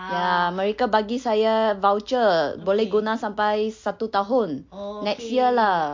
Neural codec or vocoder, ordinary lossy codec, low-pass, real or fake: none; MP3, 48 kbps; 7.2 kHz; real